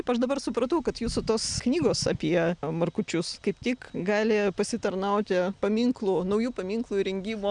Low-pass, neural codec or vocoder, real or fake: 9.9 kHz; none; real